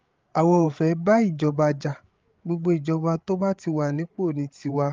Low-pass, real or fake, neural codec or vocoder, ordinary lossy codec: 7.2 kHz; fake; codec, 16 kHz, 8 kbps, FreqCodec, larger model; Opus, 32 kbps